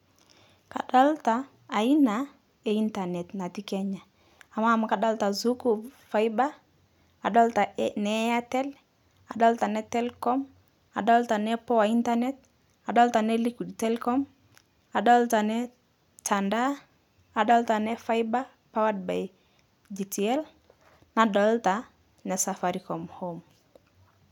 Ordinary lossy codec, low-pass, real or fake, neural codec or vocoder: none; 19.8 kHz; real; none